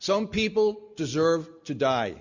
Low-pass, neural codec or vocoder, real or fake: 7.2 kHz; none; real